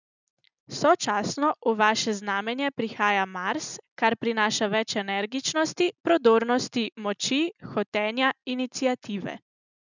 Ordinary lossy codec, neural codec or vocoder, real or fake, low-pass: none; none; real; 7.2 kHz